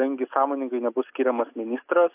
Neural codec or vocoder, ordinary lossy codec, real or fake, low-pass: none; AAC, 32 kbps; real; 3.6 kHz